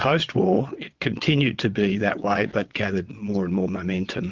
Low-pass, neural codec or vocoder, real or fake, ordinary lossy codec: 7.2 kHz; vocoder, 22.05 kHz, 80 mel bands, WaveNeXt; fake; Opus, 32 kbps